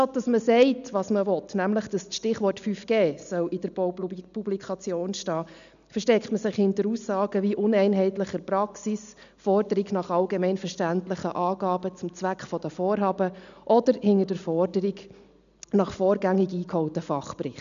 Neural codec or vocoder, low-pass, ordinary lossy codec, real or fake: none; 7.2 kHz; MP3, 96 kbps; real